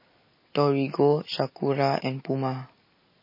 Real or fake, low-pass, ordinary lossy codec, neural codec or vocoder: real; 5.4 kHz; MP3, 24 kbps; none